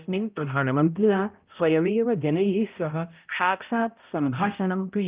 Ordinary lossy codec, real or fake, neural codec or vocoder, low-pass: Opus, 24 kbps; fake; codec, 16 kHz, 0.5 kbps, X-Codec, HuBERT features, trained on balanced general audio; 3.6 kHz